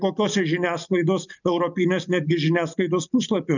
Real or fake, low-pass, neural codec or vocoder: real; 7.2 kHz; none